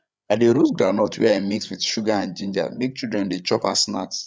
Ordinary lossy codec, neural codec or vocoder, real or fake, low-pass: none; codec, 16 kHz, 8 kbps, FreqCodec, larger model; fake; none